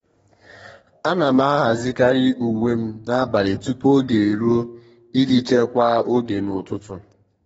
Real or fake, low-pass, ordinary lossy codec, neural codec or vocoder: fake; 14.4 kHz; AAC, 24 kbps; codec, 32 kHz, 1.9 kbps, SNAC